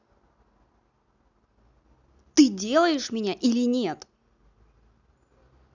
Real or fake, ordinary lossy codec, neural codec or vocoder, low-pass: real; none; none; 7.2 kHz